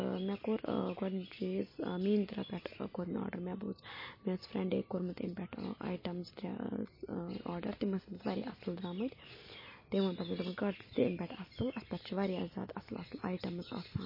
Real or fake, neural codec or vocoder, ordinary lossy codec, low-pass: real; none; MP3, 24 kbps; 5.4 kHz